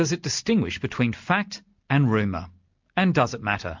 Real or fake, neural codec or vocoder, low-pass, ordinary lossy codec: real; none; 7.2 kHz; MP3, 64 kbps